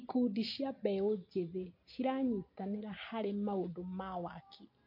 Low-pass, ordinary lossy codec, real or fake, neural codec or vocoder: 5.4 kHz; MP3, 32 kbps; real; none